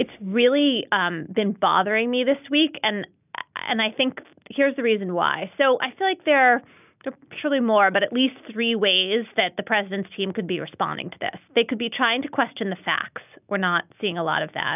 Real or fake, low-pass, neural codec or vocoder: real; 3.6 kHz; none